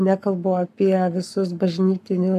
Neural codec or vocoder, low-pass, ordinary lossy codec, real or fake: codec, 44.1 kHz, 7.8 kbps, Pupu-Codec; 14.4 kHz; AAC, 96 kbps; fake